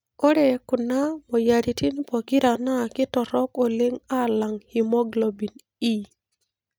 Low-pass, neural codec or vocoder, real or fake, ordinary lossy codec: none; none; real; none